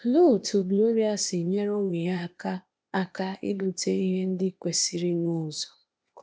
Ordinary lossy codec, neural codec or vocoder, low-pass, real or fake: none; codec, 16 kHz, 0.8 kbps, ZipCodec; none; fake